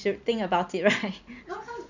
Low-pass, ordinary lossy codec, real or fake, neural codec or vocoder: 7.2 kHz; none; real; none